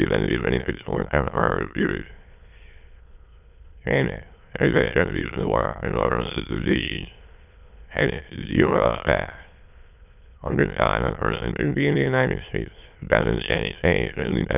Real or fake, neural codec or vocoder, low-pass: fake; autoencoder, 22.05 kHz, a latent of 192 numbers a frame, VITS, trained on many speakers; 3.6 kHz